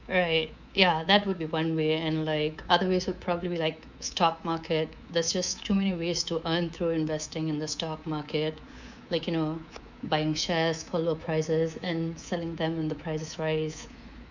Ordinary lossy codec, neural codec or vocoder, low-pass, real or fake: none; codec, 24 kHz, 3.1 kbps, DualCodec; 7.2 kHz; fake